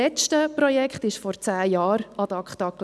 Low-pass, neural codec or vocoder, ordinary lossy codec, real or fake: none; none; none; real